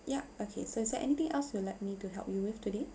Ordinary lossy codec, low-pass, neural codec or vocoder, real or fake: none; none; none; real